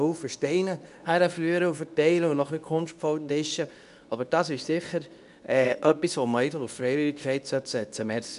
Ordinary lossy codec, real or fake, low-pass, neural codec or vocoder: none; fake; 10.8 kHz; codec, 24 kHz, 0.9 kbps, WavTokenizer, medium speech release version 2